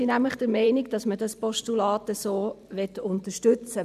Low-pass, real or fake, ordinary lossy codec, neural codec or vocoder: 14.4 kHz; fake; none; vocoder, 44.1 kHz, 128 mel bands every 512 samples, BigVGAN v2